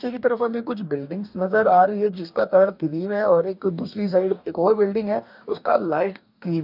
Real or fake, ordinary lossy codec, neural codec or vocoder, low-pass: fake; none; codec, 44.1 kHz, 2.6 kbps, DAC; 5.4 kHz